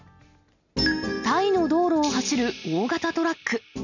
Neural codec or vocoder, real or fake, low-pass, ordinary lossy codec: none; real; 7.2 kHz; none